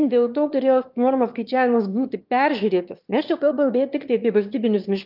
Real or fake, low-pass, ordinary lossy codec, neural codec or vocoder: fake; 5.4 kHz; Opus, 24 kbps; autoencoder, 22.05 kHz, a latent of 192 numbers a frame, VITS, trained on one speaker